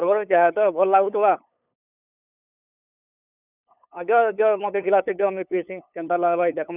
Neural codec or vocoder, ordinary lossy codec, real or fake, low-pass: codec, 16 kHz, 8 kbps, FunCodec, trained on LibriTTS, 25 frames a second; none; fake; 3.6 kHz